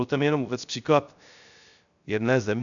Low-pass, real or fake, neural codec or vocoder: 7.2 kHz; fake; codec, 16 kHz, 0.3 kbps, FocalCodec